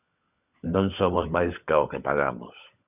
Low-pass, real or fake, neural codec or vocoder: 3.6 kHz; fake; codec, 16 kHz, 16 kbps, FunCodec, trained on LibriTTS, 50 frames a second